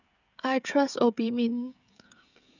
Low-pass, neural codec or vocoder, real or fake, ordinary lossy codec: 7.2 kHz; codec, 16 kHz, 16 kbps, FreqCodec, smaller model; fake; none